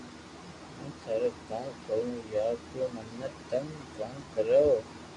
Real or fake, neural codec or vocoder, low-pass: real; none; 10.8 kHz